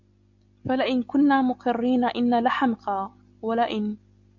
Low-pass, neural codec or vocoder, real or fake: 7.2 kHz; none; real